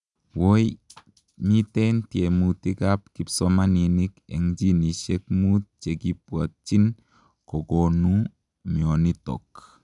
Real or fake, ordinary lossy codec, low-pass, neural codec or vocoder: real; none; 10.8 kHz; none